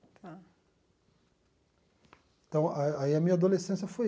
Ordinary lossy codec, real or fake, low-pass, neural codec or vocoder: none; real; none; none